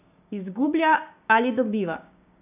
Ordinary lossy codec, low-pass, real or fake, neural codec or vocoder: none; 3.6 kHz; fake; codec, 16 kHz, 6 kbps, DAC